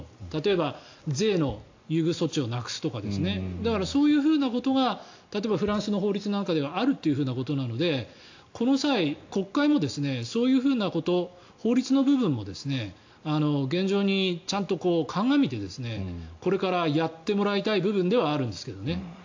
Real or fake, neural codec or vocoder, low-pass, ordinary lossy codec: real; none; 7.2 kHz; AAC, 48 kbps